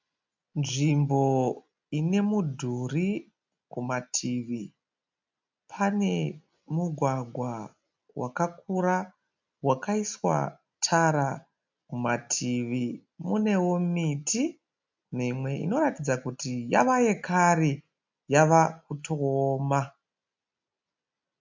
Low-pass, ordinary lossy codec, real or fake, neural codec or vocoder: 7.2 kHz; MP3, 64 kbps; real; none